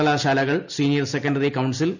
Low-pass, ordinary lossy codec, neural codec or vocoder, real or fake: 7.2 kHz; none; none; real